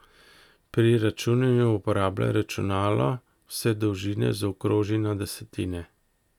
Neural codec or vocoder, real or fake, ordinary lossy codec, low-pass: none; real; none; 19.8 kHz